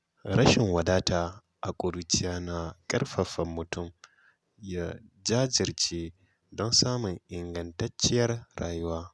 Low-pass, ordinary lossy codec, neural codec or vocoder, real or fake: none; none; none; real